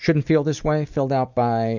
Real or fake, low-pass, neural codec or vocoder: real; 7.2 kHz; none